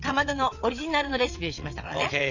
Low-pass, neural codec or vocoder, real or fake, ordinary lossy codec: 7.2 kHz; vocoder, 22.05 kHz, 80 mel bands, WaveNeXt; fake; none